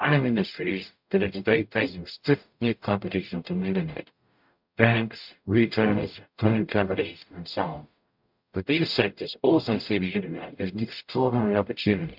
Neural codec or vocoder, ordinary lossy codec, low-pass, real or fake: codec, 44.1 kHz, 0.9 kbps, DAC; MP3, 48 kbps; 5.4 kHz; fake